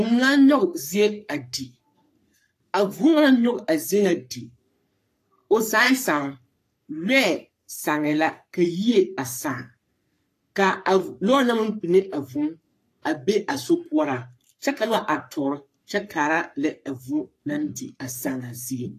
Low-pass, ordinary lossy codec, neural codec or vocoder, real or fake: 14.4 kHz; AAC, 64 kbps; codec, 44.1 kHz, 3.4 kbps, Pupu-Codec; fake